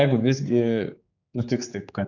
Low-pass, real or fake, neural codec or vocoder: 7.2 kHz; fake; codec, 16 kHz, 4 kbps, X-Codec, HuBERT features, trained on general audio